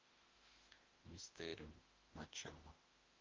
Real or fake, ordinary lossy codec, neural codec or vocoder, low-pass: fake; Opus, 24 kbps; autoencoder, 48 kHz, 32 numbers a frame, DAC-VAE, trained on Japanese speech; 7.2 kHz